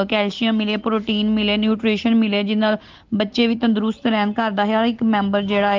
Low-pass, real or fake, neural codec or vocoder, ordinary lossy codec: 7.2 kHz; real; none; Opus, 16 kbps